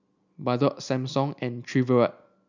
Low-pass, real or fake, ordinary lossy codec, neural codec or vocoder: 7.2 kHz; real; none; none